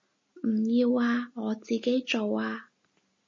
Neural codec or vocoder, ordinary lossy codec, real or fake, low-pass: none; MP3, 32 kbps; real; 7.2 kHz